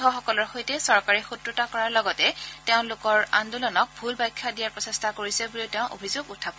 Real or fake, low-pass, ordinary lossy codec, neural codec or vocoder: real; none; none; none